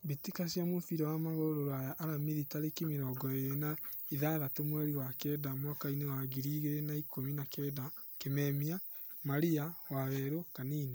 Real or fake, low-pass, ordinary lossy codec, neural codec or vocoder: real; none; none; none